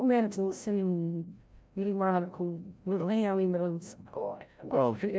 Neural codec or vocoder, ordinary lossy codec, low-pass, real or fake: codec, 16 kHz, 0.5 kbps, FreqCodec, larger model; none; none; fake